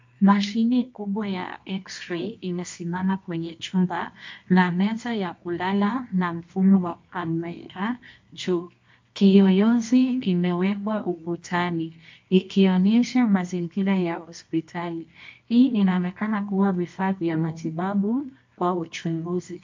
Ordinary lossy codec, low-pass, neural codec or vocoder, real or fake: MP3, 48 kbps; 7.2 kHz; codec, 24 kHz, 0.9 kbps, WavTokenizer, medium music audio release; fake